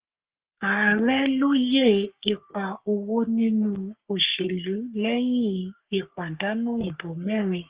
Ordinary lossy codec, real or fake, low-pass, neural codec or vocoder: Opus, 32 kbps; fake; 3.6 kHz; codec, 44.1 kHz, 3.4 kbps, Pupu-Codec